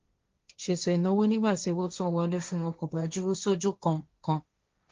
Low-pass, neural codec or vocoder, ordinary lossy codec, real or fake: 7.2 kHz; codec, 16 kHz, 1.1 kbps, Voila-Tokenizer; Opus, 32 kbps; fake